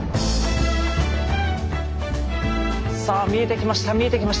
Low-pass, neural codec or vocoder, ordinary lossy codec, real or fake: none; none; none; real